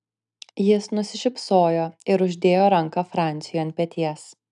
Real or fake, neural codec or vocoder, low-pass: real; none; 10.8 kHz